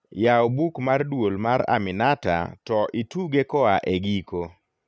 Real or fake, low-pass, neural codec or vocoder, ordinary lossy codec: real; none; none; none